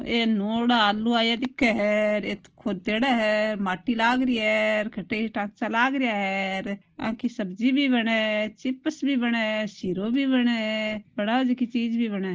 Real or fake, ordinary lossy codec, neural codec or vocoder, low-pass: real; Opus, 16 kbps; none; 7.2 kHz